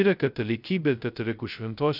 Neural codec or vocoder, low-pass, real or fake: codec, 16 kHz, 0.2 kbps, FocalCodec; 5.4 kHz; fake